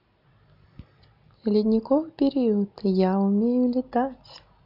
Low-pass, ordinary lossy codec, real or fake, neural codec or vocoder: 5.4 kHz; none; real; none